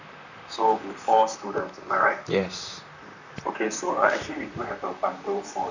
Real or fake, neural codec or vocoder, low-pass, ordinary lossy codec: fake; vocoder, 44.1 kHz, 128 mel bands, Pupu-Vocoder; 7.2 kHz; none